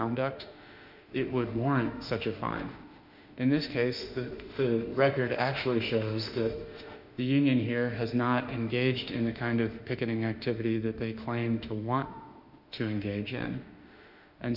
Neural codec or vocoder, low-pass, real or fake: autoencoder, 48 kHz, 32 numbers a frame, DAC-VAE, trained on Japanese speech; 5.4 kHz; fake